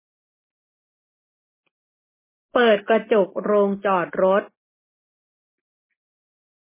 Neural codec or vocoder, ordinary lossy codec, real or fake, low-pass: none; MP3, 16 kbps; real; 3.6 kHz